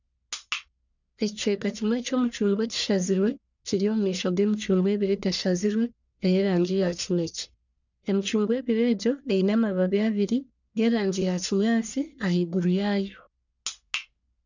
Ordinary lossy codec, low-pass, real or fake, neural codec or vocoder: none; 7.2 kHz; fake; codec, 44.1 kHz, 1.7 kbps, Pupu-Codec